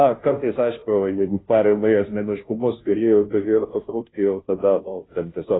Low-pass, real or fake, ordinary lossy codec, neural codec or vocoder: 7.2 kHz; fake; AAC, 16 kbps; codec, 16 kHz, 0.5 kbps, FunCodec, trained on Chinese and English, 25 frames a second